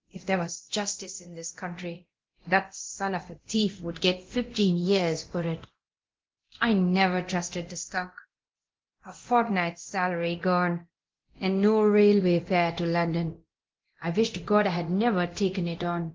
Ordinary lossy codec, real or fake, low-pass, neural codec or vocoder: Opus, 16 kbps; fake; 7.2 kHz; codec, 24 kHz, 0.9 kbps, DualCodec